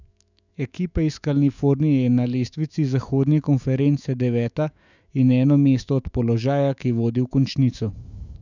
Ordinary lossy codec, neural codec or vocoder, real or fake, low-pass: none; autoencoder, 48 kHz, 128 numbers a frame, DAC-VAE, trained on Japanese speech; fake; 7.2 kHz